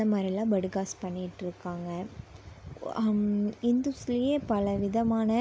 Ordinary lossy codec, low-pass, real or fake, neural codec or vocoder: none; none; real; none